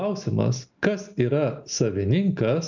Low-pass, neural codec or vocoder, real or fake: 7.2 kHz; none; real